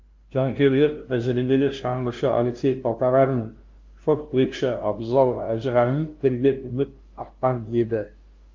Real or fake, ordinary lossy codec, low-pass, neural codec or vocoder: fake; Opus, 32 kbps; 7.2 kHz; codec, 16 kHz, 0.5 kbps, FunCodec, trained on LibriTTS, 25 frames a second